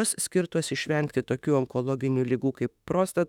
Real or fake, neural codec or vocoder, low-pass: fake; autoencoder, 48 kHz, 32 numbers a frame, DAC-VAE, trained on Japanese speech; 19.8 kHz